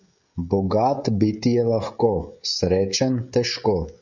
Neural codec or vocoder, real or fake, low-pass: codec, 16 kHz, 16 kbps, FreqCodec, smaller model; fake; 7.2 kHz